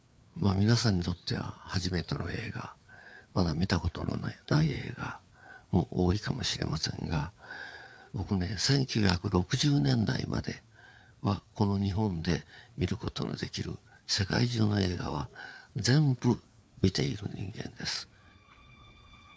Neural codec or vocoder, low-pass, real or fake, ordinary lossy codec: codec, 16 kHz, 4 kbps, FreqCodec, larger model; none; fake; none